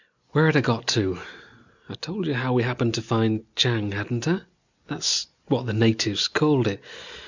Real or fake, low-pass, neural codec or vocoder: real; 7.2 kHz; none